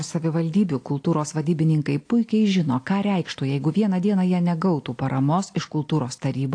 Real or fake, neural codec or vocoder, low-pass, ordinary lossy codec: real; none; 9.9 kHz; AAC, 48 kbps